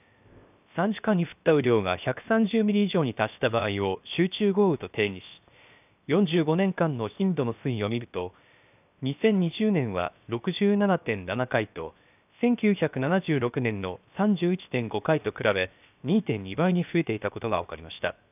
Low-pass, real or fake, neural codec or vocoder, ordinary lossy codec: 3.6 kHz; fake; codec, 16 kHz, 0.7 kbps, FocalCodec; none